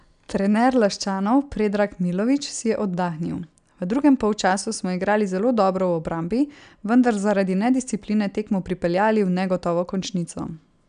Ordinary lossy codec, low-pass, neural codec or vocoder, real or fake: none; 9.9 kHz; none; real